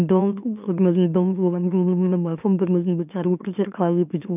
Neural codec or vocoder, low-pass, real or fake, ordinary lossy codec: autoencoder, 44.1 kHz, a latent of 192 numbers a frame, MeloTTS; 3.6 kHz; fake; none